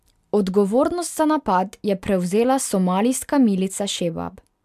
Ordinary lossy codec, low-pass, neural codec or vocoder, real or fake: MP3, 96 kbps; 14.4 kHz; none; real